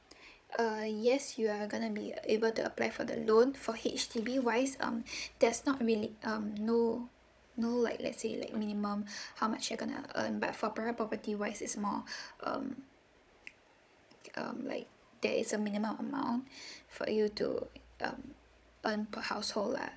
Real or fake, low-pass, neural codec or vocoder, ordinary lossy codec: fake; none; codec, 16 kHz, 16 kbps, FunCodec, trained on Chinese and English, 50 frames a second; none